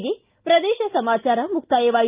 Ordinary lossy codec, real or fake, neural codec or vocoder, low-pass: Opus, 24 kbps; real; none; 3.6 kHz